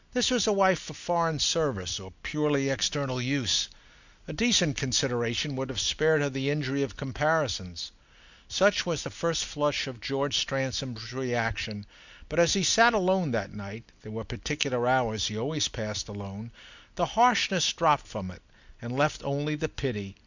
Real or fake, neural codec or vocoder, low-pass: real; none; 7.2 kHz